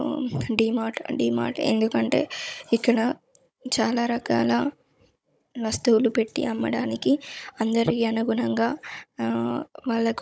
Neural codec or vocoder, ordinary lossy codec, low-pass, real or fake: codec, 16 kHz, 16 kbps, FunCodec, trained on Chinese and English, 50 frames a second; none; none; fake